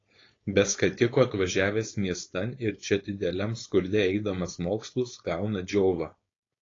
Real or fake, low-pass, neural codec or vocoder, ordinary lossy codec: fake; 7.2 kHz; codec, 16 kHz, 4.8 kbps, FACodec; AAC, 32 kbps